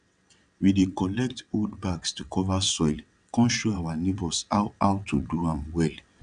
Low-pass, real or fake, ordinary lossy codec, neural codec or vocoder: 9.9 kHz; fake; none; vocoder, 22.05 kHz, 80 mel bands, WaveNeXt